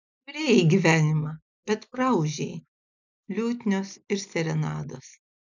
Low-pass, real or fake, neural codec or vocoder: 7.2 kHz; real; none